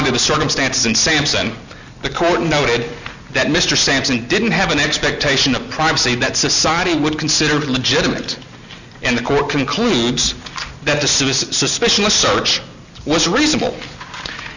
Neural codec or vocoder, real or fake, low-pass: none; real; 7.2 kHz